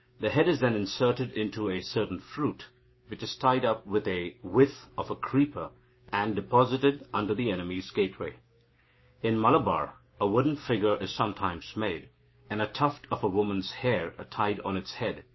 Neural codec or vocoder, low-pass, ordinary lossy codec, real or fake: codec, 16 kHz, 6 kbps, DAC; 7.2 kHz; MP3, 24 kbps; fake